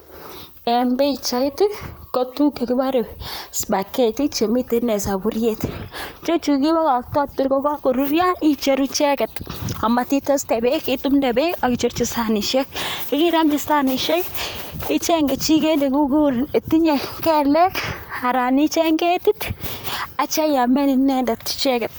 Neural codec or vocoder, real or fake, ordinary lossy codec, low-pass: vocoder, 44.1 kHz, 128 mel bands, Pupu-Vocoder; fake; none; none